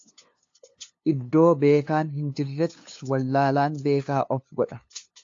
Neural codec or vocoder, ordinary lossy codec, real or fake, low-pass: codec, 16 kHz, 2 kbps, FunCodec, trained on LibriTTS, 25 frames a second; AAC, 48 kbps; fake; 7.2 kHz